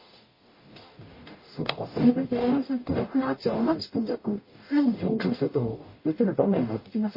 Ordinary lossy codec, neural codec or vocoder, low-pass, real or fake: MP3, 24 kbps; codec, 44.1 kHz, 0.9 kbps, DAC; 5.4 kHz; fake